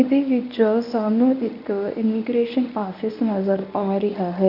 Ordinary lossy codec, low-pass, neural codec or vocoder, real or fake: none; 5.4 kHz; codec, 24 kHz, 0.9 kbps, WavTokenizer, medium speech release version 1; fake